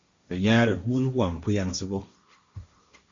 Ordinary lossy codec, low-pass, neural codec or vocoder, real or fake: Opus, 64 kbps; 7.2 kHz; codec, 16 kHz, 1.1 kbps, Voila-Tokenizer; fake